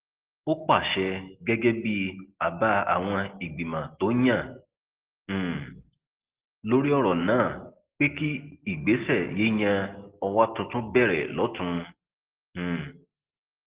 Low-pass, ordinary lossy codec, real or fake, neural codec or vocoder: 3.6 kHz; Opus, 16 kbps; real; none